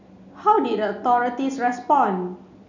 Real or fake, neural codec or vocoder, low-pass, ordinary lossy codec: real; none; 7.2 kHz; none